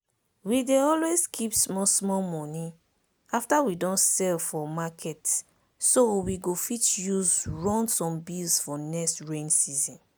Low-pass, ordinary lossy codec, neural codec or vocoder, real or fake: none; none; none; real